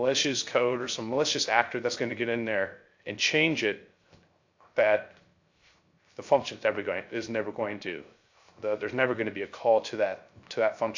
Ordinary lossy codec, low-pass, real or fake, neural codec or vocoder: AAC, 48 kbps; 7.2 kHz; fake; codec, 16 kHz, 0.3 kbps, FocalCodec